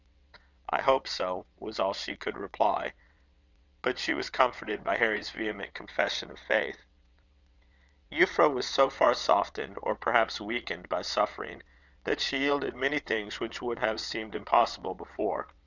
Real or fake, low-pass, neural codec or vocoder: fake; 7.2 kHz; vocoder, 22.05 kHz, 80 mel bands, WaveNeXt